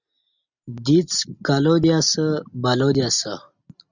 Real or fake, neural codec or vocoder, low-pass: real; none; 7.2 kHz